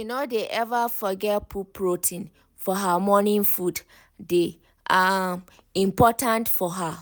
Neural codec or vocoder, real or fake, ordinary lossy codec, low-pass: none; real; none; none